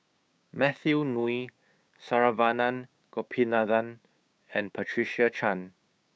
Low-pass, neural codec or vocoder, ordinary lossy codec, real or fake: none; codec, 16 kHz, 6 kbps, DAC; none; fake